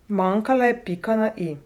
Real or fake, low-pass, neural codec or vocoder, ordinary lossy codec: fake; 19.8 kHz; vocoder, 48 kHz, 128 mel bands, Vocos; none